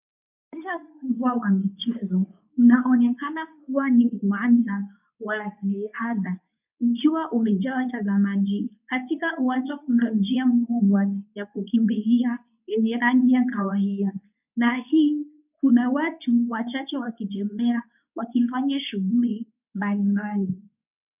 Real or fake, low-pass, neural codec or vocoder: fake; 3.6 kHz; codec, 24 kHz, 0.9 kbps, WavTokenizer, medium speech release version 2